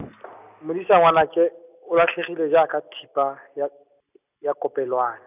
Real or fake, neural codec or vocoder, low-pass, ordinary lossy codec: real; none; 3.6 kHz; none